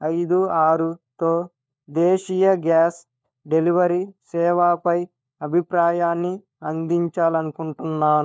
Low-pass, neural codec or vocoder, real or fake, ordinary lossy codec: none; codec, 16 kHz, 4 kbps, FunCodec, trained on LibriTTS, 50 frames a second; fake; none